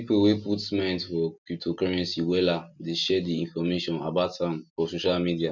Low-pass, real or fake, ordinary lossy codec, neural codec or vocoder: 7.2 kHz; real; none; none